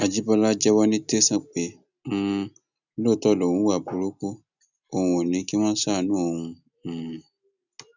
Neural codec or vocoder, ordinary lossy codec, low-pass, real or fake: none; none; 7.2 kHz; real